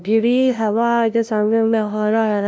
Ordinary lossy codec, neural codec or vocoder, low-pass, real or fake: none; codec, 16 kHz, 0.5 kbps, FunCodec, trained on LibriTTS, 25 frames a second; none; fake